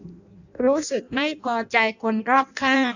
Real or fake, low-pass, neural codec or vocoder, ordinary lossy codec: fake; 7.2 kHz; codec, 16 kHz in and 24 kHz out, 0.6 kbps, FireRedTTS-2 codec; none